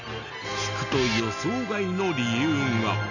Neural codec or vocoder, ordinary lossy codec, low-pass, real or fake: none; none; 7.2 kHz; real